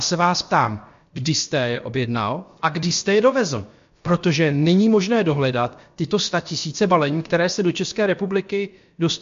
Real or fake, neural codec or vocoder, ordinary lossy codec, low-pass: fake; codec, 16 kHz, about 1 kbps, DyCAST, with the encoder's durations; MP3, 48 kbps; 7.2 kHz